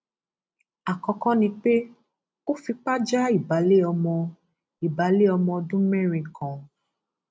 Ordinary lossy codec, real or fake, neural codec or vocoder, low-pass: none; real; none; none